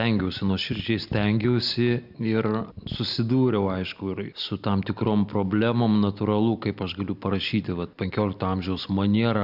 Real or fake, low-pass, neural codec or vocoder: real; 5.4 kHz; none